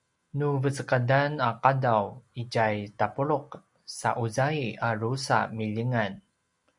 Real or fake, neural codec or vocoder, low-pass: real; none; 10.8 kHz